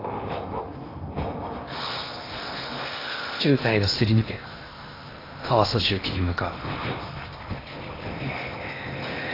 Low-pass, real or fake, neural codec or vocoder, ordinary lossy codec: 5.4 kHz; fake; codec, 16 kHz in and 24 kHz out, 0.8 kbps, FocalCodec, streaming, 65536 codes; AAC, 24 kbps